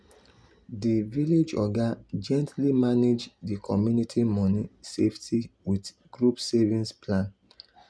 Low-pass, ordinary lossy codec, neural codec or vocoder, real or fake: none; none; none; real